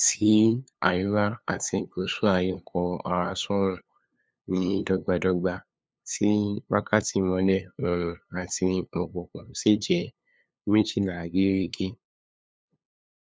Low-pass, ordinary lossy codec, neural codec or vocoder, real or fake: none; none; codec, 16 kHz, 2 kbps, FunCodec, trained on LibriTTS, 25 frames a second; fake